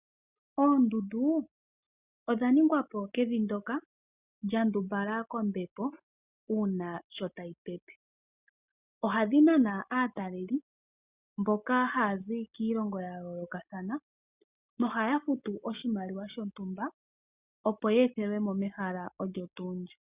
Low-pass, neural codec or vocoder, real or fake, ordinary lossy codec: 3.6 kHz; none; real; Opus, 64 kbps